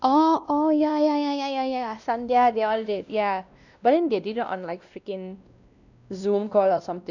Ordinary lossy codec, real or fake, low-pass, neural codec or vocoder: none; fake; 7.2 kHz; codec, 16 kHz, 1 kbps, X-Codec, WavLM features, trained on Multilingual LibriSpeech